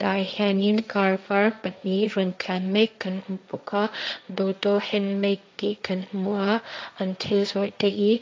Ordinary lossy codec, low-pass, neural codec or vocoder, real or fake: none; none; codec, 16 kHz, 1.1 kbps, Voila-Tokenizer; fake